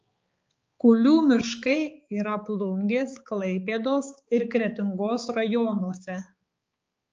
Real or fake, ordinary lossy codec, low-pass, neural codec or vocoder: fake; Opus, 24 kbps; 7.2 kHz; codec, 16 kHz, 4 kbps, X-Codec, HuBERT features, trained on balanced general audio